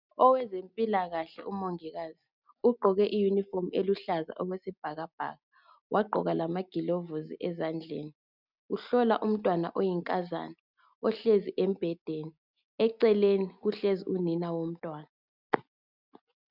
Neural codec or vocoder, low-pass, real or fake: none; 5.4 kHz; real